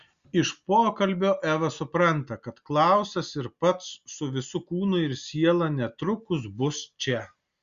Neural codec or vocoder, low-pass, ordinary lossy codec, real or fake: none; 7.2 kHz; Opus, 64 kbps; real